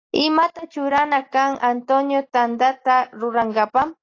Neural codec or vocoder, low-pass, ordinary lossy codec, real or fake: none; 7.2 kHz; AAC, 32 kbps; real